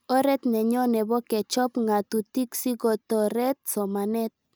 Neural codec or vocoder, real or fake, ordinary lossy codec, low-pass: none; real; none; none